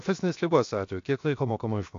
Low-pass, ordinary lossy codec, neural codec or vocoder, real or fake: 7.2 kHz; AAC, 48 kbps; codec, 16 kHz, 0.7 kbps, FocalCodec; fake